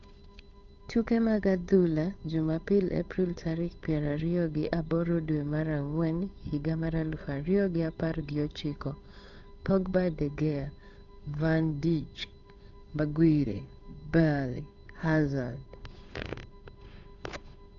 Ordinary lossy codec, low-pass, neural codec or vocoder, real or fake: none; 7.2 kHz; codec, 16 kHz, 8 kbps, FunCodec, trained on Chinese and English, 25 frames a second; fake